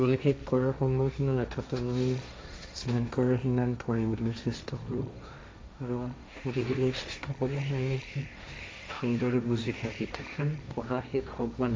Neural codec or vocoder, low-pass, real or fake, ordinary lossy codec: codec, 16 kHz, 1.1 kbps, Voila-Tokenizer; none; fake; none